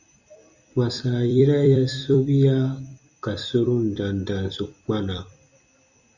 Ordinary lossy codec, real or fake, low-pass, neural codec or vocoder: Opus, 64 kbps; fake; 7.2 kHz; vocoder, 44.1 kHz, 128 mel bands every 256 samples, BigVGAN v2